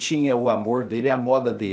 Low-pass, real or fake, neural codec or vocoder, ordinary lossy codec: none; fake; codec, 16 kHz, 0.8 kbps, ZipCodec; none